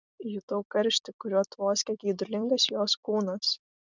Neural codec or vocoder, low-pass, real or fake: vocoder, 44.1 kHz, 128 mel bands every 256 samples, BigVGAN v2; 7.2 kHz; fake